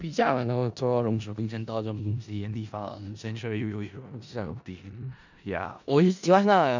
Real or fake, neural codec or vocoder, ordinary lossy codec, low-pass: fake; codec, 16 kHz in and 24 kHz out, 0.4 kbps, LongCat-Audio-Codec, four codebook decoder; none; 7.2 kHz